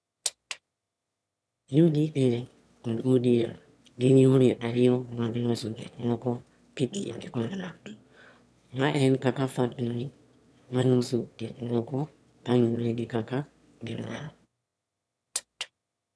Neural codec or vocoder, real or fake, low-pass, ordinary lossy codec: autoencoder, 22.05 kHz, a latent of 192 numbers a frame, VITS, trained on one speaker; fake; none; none